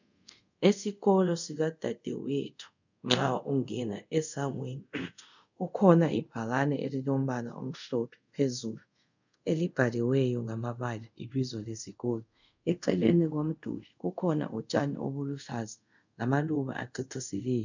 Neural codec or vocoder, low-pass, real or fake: codec, 24 kHz, 0.5 kbps, DualCodec; 7.2 kHz; fake